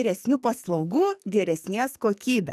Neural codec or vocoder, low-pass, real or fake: codec, 44.1 kHz, 2.6 kbps, SNAC; 14.4 kHz; fake